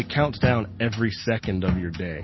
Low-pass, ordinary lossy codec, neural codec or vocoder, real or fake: 7.2 kHz; MP3, 24 kbps; none; real